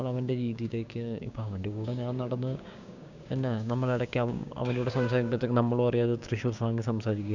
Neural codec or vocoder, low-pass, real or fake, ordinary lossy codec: codec, 16 kHz, 6 kbps, DAC; 7.2 kHz; fake; none